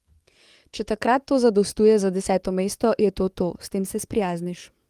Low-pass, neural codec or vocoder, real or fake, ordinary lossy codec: 14.4 kHz; codec, 44.1 kHz, 7.8 kbps, DAC; fake; Opus, 24 kbps